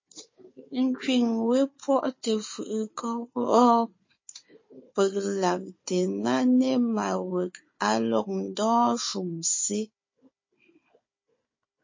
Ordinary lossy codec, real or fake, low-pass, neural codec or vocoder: MP3, 32 kbps; fake; 7.2 kHz; codec, 16 kHz, 4 kbps, FunCodec, trained on Chinese and English, 50 frames a second